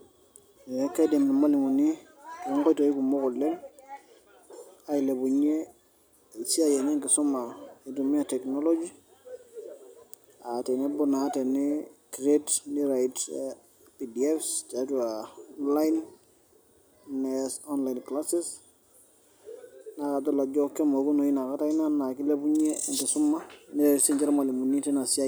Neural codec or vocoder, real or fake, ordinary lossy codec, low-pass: none; real; none; none